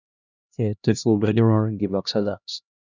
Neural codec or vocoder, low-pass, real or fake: codec, 16 kHz, 1 kbps, X-Codec, HuBERT features, trained on LibriSpeech; 7.2 kHz; fake